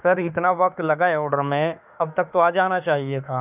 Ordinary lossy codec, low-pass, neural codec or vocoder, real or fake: none; 3.6 kHz; autoencoder, 48 kHz, 32 numbers a frame, DAC-VAE, trained on Japanese speech; fake